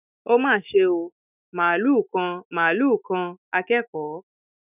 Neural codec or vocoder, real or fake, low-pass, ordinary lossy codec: autoencoder, 48 kHz, 128 numbers a frame, DAC-VAE, trained on Japanese speech; fake; 3.6 kHz; none